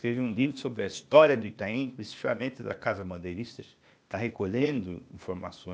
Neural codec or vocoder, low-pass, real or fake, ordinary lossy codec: codec, 16 kHz, 0.8 kbps, ZipCodec; none; fake; none